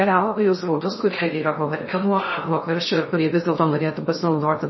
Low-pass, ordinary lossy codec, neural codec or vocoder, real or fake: 7.2 kHz; MP3, 24 kbps; codec, 16 kHz in and 24 kHz out, 0.6 kbps, FocalCodec, streaming, 2048 codes; fake